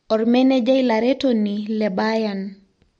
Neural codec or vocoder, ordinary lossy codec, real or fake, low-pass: none; MP3, 48 kbps; real; 9.9 kHz